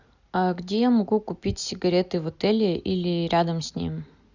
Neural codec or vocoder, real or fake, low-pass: none; real; 7.2 kHz